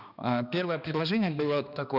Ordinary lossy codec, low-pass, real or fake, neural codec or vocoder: none; 5.4 kHz; fake; codec, 16 kHz, 2 kbps, X-Codec, HuBERT features, trained on balanced general audio